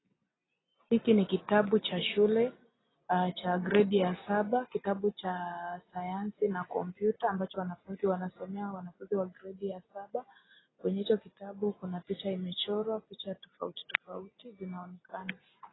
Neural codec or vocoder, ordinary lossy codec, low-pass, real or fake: none; AAC, 16 kbps; 7.2 kHz; real